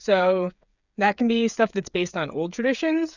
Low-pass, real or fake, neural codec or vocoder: 7.2 kHz; fake; codec, 16 kHz, 8 kbps, FreqCodec, smaller model